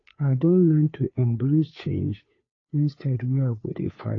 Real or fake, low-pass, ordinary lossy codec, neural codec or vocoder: fake; 7.2 kHz; AAC, 32 kbps; codec, 16 kHz, 2 kbps, FunCodec, trained on Chinese and English, 25 frames a second